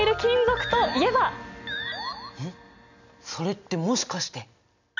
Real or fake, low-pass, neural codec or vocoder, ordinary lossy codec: real; 7.2 kHz; none; none